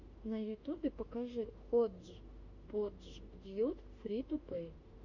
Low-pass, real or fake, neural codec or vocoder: 7.2 kHz; fake; autoencoder, 48 kHz, 32 numbers a frame, DAC-VAE, trained on Japanese speech